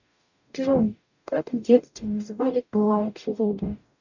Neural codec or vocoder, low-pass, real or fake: codec, 44.1 kHz, 0.9 kbps, DAC; 7.2 kHz; fake